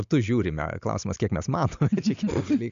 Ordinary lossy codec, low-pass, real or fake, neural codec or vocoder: MP3, 64 kbps; 7.2 kHz; real; none